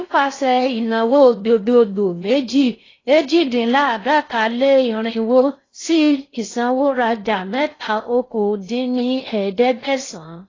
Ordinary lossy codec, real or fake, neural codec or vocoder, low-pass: AAC, 32 kbps; fake; codec, 16 kHz in and 24 kHz out, 0.6 kbps, FocalCodec, streaming, 4096 codes; 7.2 kHz